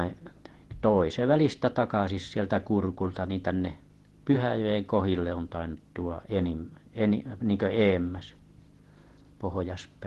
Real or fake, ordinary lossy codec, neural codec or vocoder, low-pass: fake; Opus, 16 kbps; vocoder, 48 kHz, 128 mel bands, Vocos; 14.4 kHz